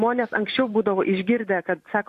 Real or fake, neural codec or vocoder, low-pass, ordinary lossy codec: fake; vocoder, 44.1 kHz, 128 mel bands every 512 samples, BigVGAN v2; 14.4 kHz; AAC, 64 kbps